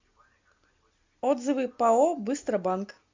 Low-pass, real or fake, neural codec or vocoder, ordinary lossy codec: 7.2 kHz; real; none; MP3, 64 kbps